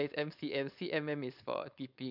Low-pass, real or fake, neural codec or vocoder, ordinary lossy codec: 5.4 kHz; fake; codec, 16 kHz, 4.8 kbps, FACodec; none